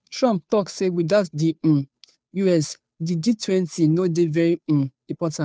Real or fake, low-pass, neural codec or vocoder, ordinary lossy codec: fake; none; codec, 16 kHz, 2 kbps, FunCodec, trained on Chinese and English, 25 frames a second; none